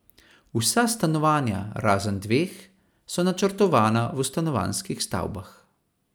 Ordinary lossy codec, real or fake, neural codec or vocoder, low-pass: none; real; none; none